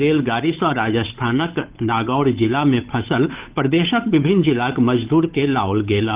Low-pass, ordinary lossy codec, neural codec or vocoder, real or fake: 3.6 kHz; Opus, 24 kbps; codec, 16 kHz, 8 kbps, FunCodec, trained on Chinese and English, 25 frames a second; fake